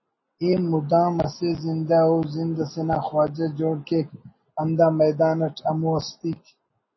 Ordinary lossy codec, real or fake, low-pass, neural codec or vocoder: MP3, 24 kbps; real; 7.2 kHz; none